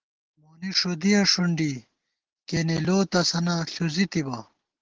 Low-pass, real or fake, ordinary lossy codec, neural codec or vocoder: 7.2 kHz; real; Opus, 16 kbps; none